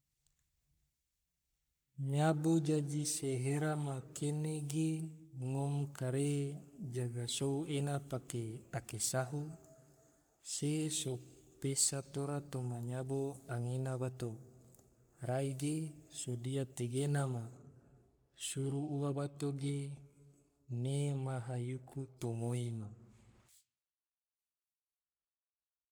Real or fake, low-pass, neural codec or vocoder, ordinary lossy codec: fake; none; codec, 44.1 kHz, 3.4 kbps, Pupu-Codec; none